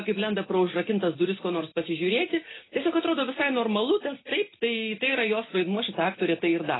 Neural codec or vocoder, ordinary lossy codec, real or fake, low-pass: none; AAC, 16 kbps; real; 7.2 kHz